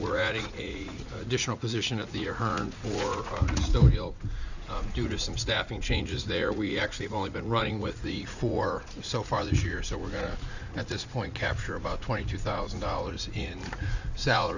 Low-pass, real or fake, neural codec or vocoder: 7.2 kHz; fake; vocoder, 44.1 kHz, 80 mel bands, Vocos